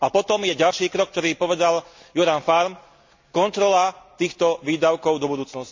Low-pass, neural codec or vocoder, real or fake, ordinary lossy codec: 7.2 kHz; none; real; MP3, 48 kbps